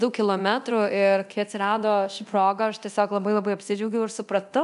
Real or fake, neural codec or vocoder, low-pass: fake; codec, 24 kHz, 0.9 kbps, DualCodec; 10.8 kHz